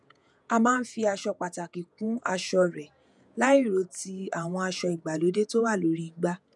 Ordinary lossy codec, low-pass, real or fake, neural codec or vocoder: none; 10.8 kHz; fake; vocoder, 48 kHz, 128 mel bands, Vocos